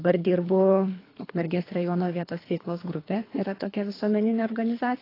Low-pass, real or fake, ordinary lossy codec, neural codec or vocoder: 5.4 kHz; fake; AAC, 24 kbps; codec, 24 kHz, 3 kbps, HILCodec